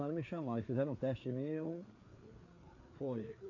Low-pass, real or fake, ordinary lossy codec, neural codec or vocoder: 7.2 kHz; fake; AAC, 48 kbps; codec, 16 kHz, 4 kbps, FreqCodec, larger model